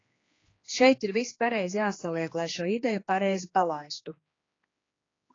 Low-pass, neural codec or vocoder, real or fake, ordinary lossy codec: 7.2 kHz; codec, 16 kHz, 2 kbps, X-Codec, HuBERT features, trained on general audio; fake; AAC, 32 kbps